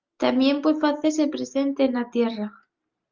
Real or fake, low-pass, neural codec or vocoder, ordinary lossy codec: real; 7.2 kHz; none; Opus, 24 kbps